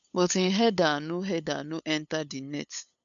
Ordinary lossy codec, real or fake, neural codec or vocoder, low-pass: none; fake; codec, 16 kHz, 8 kbps, FunCodec, trained on LibriTTS, 25 frames a second; 7.2 kHz